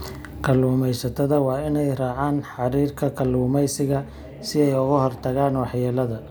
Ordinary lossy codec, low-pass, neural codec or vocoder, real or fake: none; none; none; real